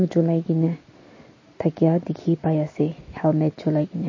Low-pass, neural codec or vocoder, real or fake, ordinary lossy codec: 7.2 kHz; none; real; MP3, 32 kbps